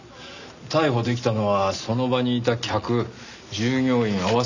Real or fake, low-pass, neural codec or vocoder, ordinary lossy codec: real; 7.2 kHz; none; none